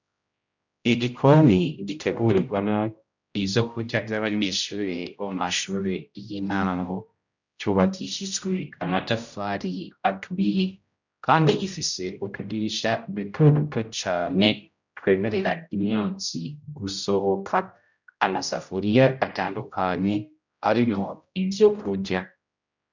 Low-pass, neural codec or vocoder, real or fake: 7.2 kHz; codec, 16 kHz, 0.5 kbps, X-Codec, HuBERT features, trained on general audio; fake